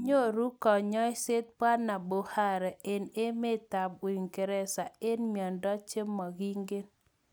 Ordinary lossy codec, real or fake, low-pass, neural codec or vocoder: none; real; none; none